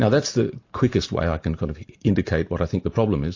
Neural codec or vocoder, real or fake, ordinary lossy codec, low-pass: none; real; AAC, 48 kbps; 7.2 kHz